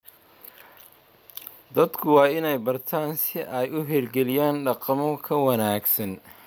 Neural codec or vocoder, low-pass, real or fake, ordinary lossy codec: none; none; real; none